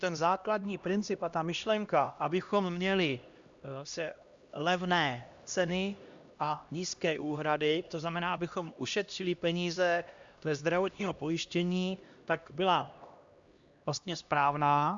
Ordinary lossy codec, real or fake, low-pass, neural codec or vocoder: Opus, 64 kbps; fake; 7.2 kHz; codec, 16 kHz, 1 kbps, X-Codec, HuBERT features, trained on LibriSpeech